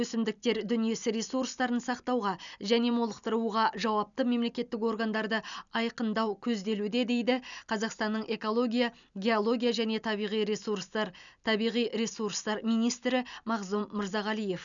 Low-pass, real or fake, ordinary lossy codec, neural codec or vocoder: 7.2 kHz; real; none; none